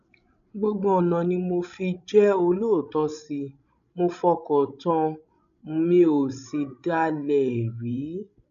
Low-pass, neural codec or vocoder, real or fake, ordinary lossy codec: 7.2 kHz; codec, 16 kHz, 16 kbps, FreqCodec, larger model; fake; none